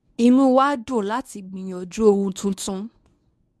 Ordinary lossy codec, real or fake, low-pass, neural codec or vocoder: none; fake; none; codec, 24 kHz, 0.9 kbps, WavTokenizer, medium speech release version 1